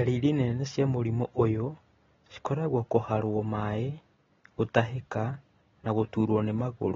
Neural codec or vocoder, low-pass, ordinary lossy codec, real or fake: none; 7.2 kHz; AAC, 24 kbps; real